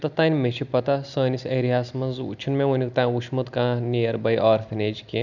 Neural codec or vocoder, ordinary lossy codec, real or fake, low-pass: none; none; real; 7.2 kHz